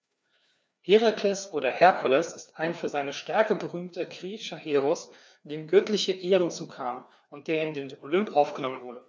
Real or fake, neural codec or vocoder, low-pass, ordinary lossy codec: fake; codec, 16 kHz, 2 kbps, FreqCodec, larger model; none; none